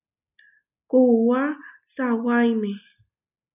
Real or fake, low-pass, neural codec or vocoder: real; 3.6 kHz; none